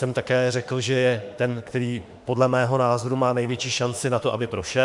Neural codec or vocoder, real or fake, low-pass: autoencoder, 48 kHz, 32 numbers a frame, DAC-VAE, trained on Japanese speech; fake; 10.8 kHz